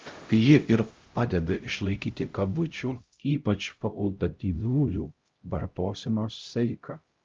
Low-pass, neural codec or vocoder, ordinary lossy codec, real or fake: 7.2 kHz; codec, 16 kHz, 0.5 kbps, X-Codec, HuBERT features, trained on LibriSpeech; Opus, 32 kbps; fake